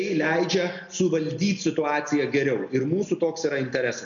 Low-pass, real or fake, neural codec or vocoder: 7.2 kHz; real; none